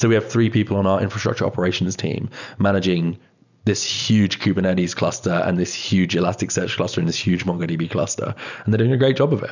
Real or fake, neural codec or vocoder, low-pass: real; none; 7.2 kHz